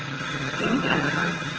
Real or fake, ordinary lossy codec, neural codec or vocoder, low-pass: fake; Opus, 16 kbps; vocoder, 22.05 kHz, 80 mel bands, HiFi-GAN; 7.2 kHz